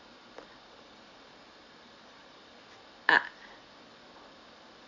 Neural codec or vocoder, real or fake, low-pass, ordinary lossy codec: none; real; 7.2 kHz; MP3, 48 kbps